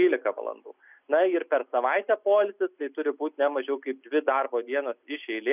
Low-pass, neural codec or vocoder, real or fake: 3.6 kHz; none; real